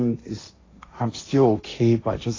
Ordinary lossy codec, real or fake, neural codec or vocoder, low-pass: AAC, 32 kbps; fake; codec, 16 kHz, 1.1 kbps, Voila-Tokenizer; 7.2 kHz